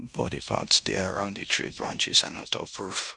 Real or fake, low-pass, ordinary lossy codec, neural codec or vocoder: fake; 10.8 kHz; none; codec, 16 kHz in and 24 kHz out, 0.9 kbps, LongCat-Audio-Codec, fine tuned four codebook decoder